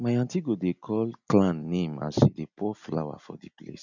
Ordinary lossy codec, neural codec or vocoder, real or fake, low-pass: none; none; real; 7.2 kHz